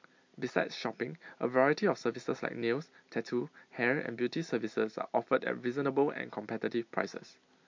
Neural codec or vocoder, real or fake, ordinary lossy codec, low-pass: none; real; MP3, 48 kbps; 7.2 kHz